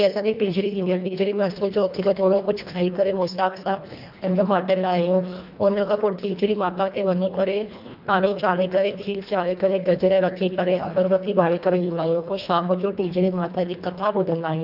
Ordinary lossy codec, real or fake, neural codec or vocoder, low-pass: none; fake; codec, 24 kHz, 1.5 kbps, HILCodec; 5.4 kHz